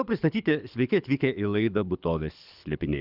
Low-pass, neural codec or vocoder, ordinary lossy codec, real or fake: 5.4 kHz; codec, 24 kHz, 6 kbps, HILCodec; Opus, 64 kbps; fake